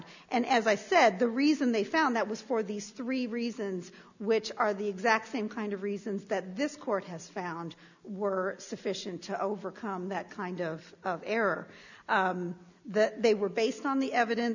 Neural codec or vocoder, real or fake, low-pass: none; real; 7.2 kHz